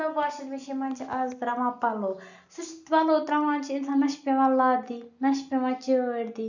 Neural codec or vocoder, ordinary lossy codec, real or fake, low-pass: none; none; real; 7.2 kHz